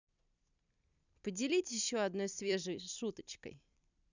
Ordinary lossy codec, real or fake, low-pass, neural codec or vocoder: none; fake; 7.2 kHz; vocoder, 44.1 kHz, 128 mel bands every 256 samples, BigVGAN v2